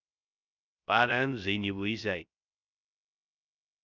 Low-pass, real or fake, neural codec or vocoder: 7.2 kHz; fake; codec, 16 kHz, 0.3 kbps, FocalCodec